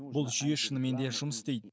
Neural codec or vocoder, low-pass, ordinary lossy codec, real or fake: none; none; none; real